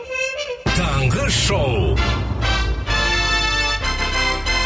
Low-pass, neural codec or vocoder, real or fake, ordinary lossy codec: none; none; real; none